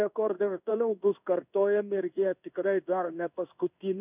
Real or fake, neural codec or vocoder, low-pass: fake; codec, 16 kHz in and 24 kHz out, 1 kbps, XY-Tokenizer; 3.6 kHz